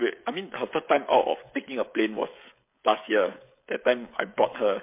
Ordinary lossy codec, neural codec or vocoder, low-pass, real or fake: MP3, 24 kbps; codec, 16 kHz, 16 kbps, FreqCodec, smaller model; 3.6 kHz; fake